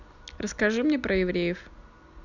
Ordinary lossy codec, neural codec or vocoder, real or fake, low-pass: none; none; real; 7.2 kHz